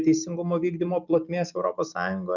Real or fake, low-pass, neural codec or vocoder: real; 7.2 kHz; none